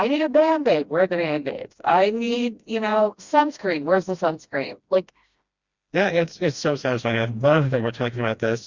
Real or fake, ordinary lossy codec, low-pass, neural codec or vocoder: fake; Opus, 64 kbps; 7.2 kHz; codec, 16 kHz, 1 kbps, FreqCodec, smaller model